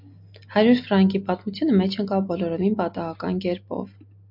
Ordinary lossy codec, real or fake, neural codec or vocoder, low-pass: MP3, 48 kbps; real; none; 5.4 kHz